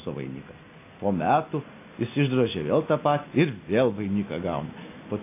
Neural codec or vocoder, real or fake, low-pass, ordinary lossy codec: none; real; 3.6 kHz; AAC, 24 kbps